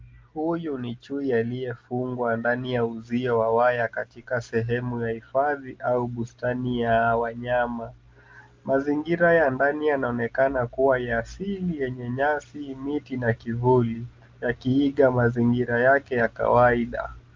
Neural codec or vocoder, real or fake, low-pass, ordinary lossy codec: none; real; 7.2 kHz; Opus, 24 kbps